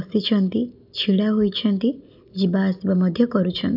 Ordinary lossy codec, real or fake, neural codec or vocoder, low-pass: none; real; none; 5.4 kHz